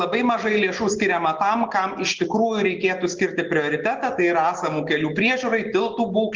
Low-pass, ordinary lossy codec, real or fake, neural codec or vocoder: 7.2 kHz; Opus, 16 kbps; real; none